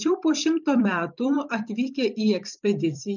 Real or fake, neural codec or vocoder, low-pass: fake; vocoder, 44.1 kHz, 128 mel bands every 256 samples, BigVGAN v2; 7.2 kHz